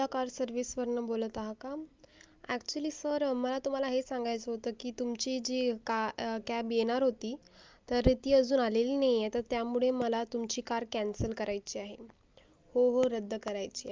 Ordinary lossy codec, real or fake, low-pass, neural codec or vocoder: Opus, 24 kbps; real; 7.2 kHz; none